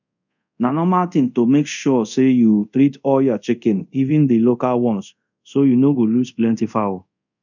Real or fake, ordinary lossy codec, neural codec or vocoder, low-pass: fake; none; codec, 24 kHz, 0.5 kbps, DualCodec; 7.2 kHz